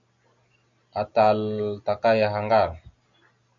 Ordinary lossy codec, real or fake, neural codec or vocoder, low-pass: MP3, 64 kbps; real; none; 7.2 kHz